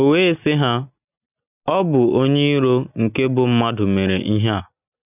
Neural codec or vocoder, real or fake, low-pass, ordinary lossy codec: none; real; 3.6 kHz; none